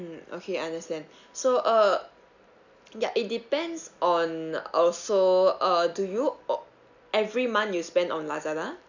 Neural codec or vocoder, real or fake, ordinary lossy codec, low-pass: none; real; none; 7.2 kHz